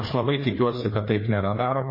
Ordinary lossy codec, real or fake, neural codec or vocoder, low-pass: MP3, 24 kbps; fake; codec, 16 kHz, 2 kbps, FreqCodec, larger model; 5.4 kHz